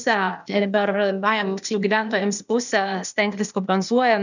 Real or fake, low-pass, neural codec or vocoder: fake; 7.2 kHz; codec, 16 kHz, 0.8 kbps, ZipCodec